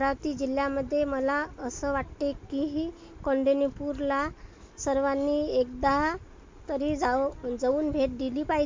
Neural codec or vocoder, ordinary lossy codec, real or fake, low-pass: none; MP3, 48 kbps; real; 7.2 kHz